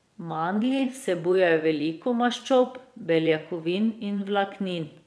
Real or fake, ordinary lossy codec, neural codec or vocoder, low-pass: fake; none; vocoder, 22.05 kHz, 80 mel bands, WaveNeXt; none